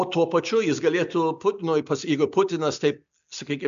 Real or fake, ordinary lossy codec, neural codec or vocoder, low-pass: real; AAC, 96 kbps; none; 7.2 kHz